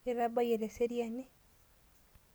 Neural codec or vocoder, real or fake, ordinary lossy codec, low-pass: none; real; none; none